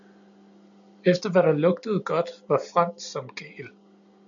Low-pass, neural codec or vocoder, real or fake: 7.2 kHz; none; real